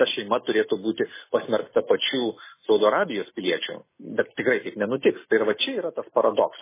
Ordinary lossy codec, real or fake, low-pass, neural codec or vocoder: MP3, 16 kbps; real; 3.6 kHz; none